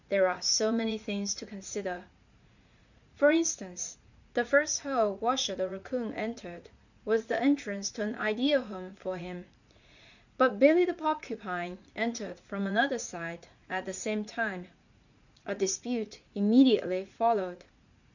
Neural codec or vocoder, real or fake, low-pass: vocoder, 44.1 kHz, 80 mel bands, Vocos; fake; 7.2 kHz